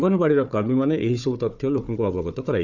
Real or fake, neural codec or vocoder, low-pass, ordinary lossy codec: fake; codec, 16 kHz, 4 kbps, FunCodec, trained on Chinese and English, 50 frames a second; 7.2 kHz; none